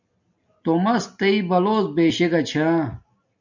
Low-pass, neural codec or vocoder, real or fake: 7.2 kHz; none; real